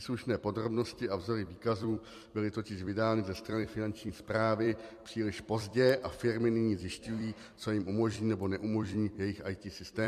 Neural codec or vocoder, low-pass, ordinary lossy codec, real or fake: vocoder, 44.1 kHz, 128 mel bands every 256 samples, BigVGAN v2; 14.4 kHz; MP3, 64 kbps; fake